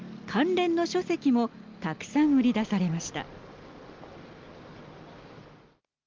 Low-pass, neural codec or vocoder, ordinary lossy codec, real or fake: 7.2 kHz; none; Opus, 32 kbps; real